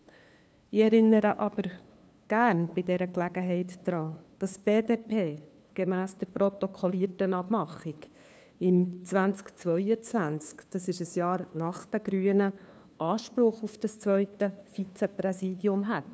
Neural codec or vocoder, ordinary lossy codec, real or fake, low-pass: codec, 16 kHz, 2 kbps, FunCodec, trained on LibriTTS, 25 frames a second; none; fake; none